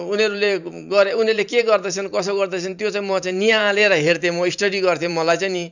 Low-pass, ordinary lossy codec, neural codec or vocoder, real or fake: 7.2 kHz; none; vocoder, 44.1 kHz, 128 mel bands every 512 samples, BigVGAN v2; fake